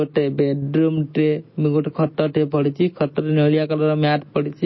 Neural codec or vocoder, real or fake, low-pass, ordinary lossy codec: none; real; 7.2 kHz; MP3, 24 kbps